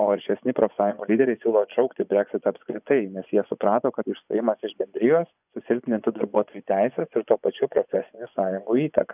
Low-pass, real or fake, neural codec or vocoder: 3.6 kHz; fake; vocoder, 24 kHz, 100 mel bands, Vocos